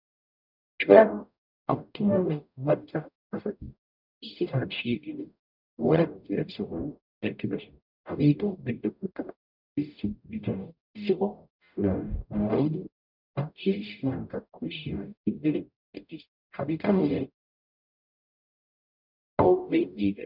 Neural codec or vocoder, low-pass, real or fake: codec, 44.1 kHz, 0.9 kbps, DAC; 5.4 kHz; fake